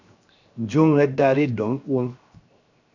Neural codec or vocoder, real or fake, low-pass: codec, 16 kHz, 0.7 kbps, FocalCodec; fake; 7.2 kHz